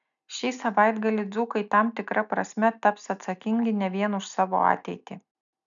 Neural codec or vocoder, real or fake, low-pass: none; real; 7.2 kHz